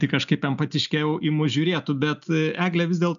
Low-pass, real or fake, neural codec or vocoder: 7.2 kHz; real; none